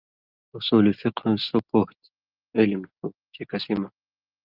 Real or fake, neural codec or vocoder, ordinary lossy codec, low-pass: real; none; Opus, 32 kbps; 5.4 kHz